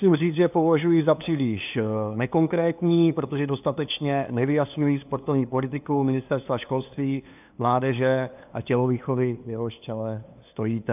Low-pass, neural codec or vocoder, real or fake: 3.6 kHz; codec, 16 kHz, 2 kbps, FunCodec, trained on LibriTTS, 25 frames a second; fake